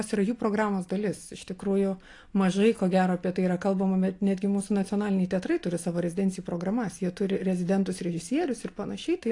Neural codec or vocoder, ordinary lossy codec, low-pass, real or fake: none; AAC, 48 kbps; 10.8 kHz; real